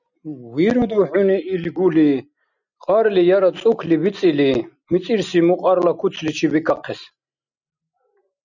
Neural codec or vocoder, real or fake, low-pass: none; real; 7.2 kHz